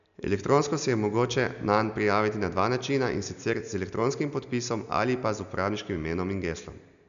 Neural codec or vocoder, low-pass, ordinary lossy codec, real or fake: none; 7.2 kHz; none; real